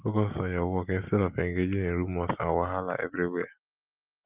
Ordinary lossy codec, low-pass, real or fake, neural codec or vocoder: Opus, 24 kbps; 3.6 kHz; real; none